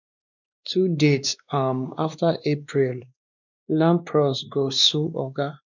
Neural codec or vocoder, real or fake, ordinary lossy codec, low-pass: codec, 16 kHz, 2 kbps, X-Codec, WavLM features, trained on Multilingual LibriSpeech; fake; none; 7.2 kHz